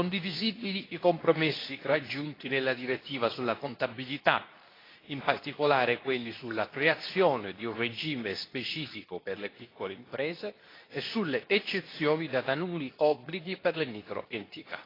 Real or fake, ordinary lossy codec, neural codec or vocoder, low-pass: fake; AAC, 24 kbps; codec, 24 kHz, 0.9 kbps, WavTokenizer, medium speech release version 2; 5.4 kHz